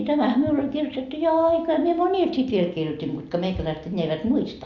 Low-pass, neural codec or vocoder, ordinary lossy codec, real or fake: 7.2 kHz; none; Opus, 64 kbps; real